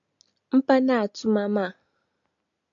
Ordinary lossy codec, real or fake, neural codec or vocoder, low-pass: AAC, 64 kbps; real; none; 7.2 kHz